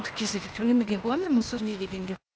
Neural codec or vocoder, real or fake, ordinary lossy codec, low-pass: codec, 16 kHz, 0.8 kbps, ZipCodec; fake; none; none